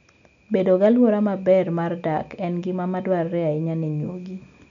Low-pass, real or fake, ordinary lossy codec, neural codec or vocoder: 7.2 kHz; real; none; none